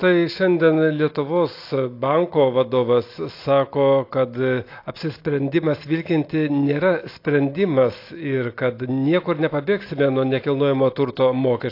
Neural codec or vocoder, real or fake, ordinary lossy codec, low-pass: none; real; AAC, 32 kbps; 5.4 kHz